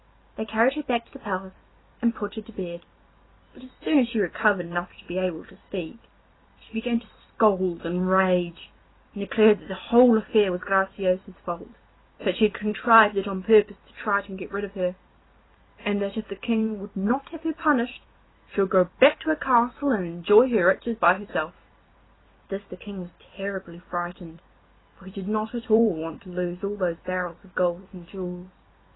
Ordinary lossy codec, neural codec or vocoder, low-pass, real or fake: AAC, 16 kbps; vocoder, 44.1 kHz, 128 mel bands every 256 samples, BigVGAN v2; 7.2 kHz; fake